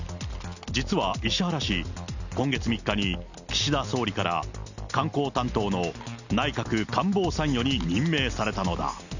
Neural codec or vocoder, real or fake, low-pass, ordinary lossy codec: none; real; 7.2 kHz; none